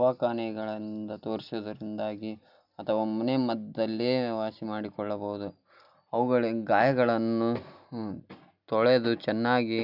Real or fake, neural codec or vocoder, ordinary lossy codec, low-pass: real; none; none; 5.4 kHz